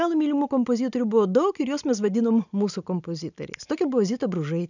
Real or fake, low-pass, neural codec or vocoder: real; 7.2 kHz; none